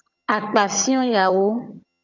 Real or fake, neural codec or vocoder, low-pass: fake; vocoder, 22.05 kHz, 80 mel bands, HiFi-GAN; 7.2 kHz